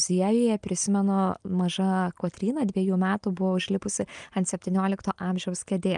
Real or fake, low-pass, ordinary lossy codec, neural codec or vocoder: real; 9.9 kHz; Opus, 32 kbps; none